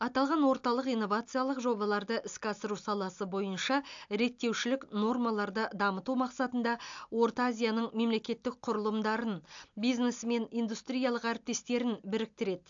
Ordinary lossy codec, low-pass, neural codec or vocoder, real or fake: AAC, 64 kbps; 7.2 kHz; none; real